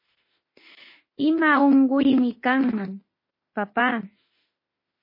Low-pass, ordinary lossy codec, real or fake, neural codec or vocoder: 5.4 kHz; MP3, 24 kbps; fake; autoencoder, 48 kHz, 32 numbers a frame, DAC-VAE, trained on Japanese speech